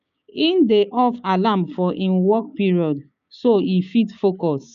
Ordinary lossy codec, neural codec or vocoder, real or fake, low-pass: AAC, 96 kbps; codec, 16 kHz, 6 kbps, DAC; fake; 7.2 kHz